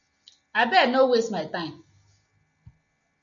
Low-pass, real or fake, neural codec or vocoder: 7.2 kHz; real; none